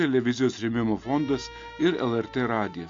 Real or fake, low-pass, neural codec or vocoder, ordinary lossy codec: real; 7.2 kHz; none; AAC, 48 kbps